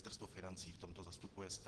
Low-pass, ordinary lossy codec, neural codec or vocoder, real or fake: 9.9 kHz; Opus, 16 kbps; vocoder, 22.05 kHz, 80 mel bands, Vocos; fake